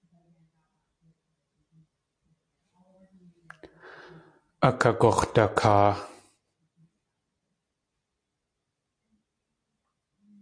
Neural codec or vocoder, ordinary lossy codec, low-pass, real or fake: none; AAC, 48 kbps; 9.9 kHz; real